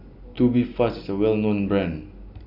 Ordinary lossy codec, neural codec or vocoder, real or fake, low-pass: none; none; real; 5.4 kHz